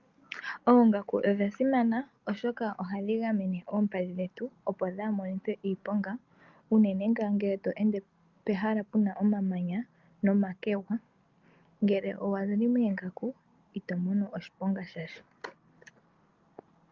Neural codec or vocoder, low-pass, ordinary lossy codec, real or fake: none; 7.2 kHz; Opus, 32 kbps; real